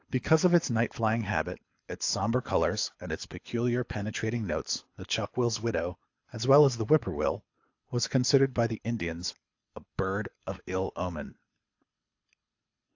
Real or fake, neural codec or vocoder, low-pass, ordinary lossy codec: fake; codec, 24 kHz, 6 kbps, HILCodec; 7.2 kHz; AAC, 48 kbps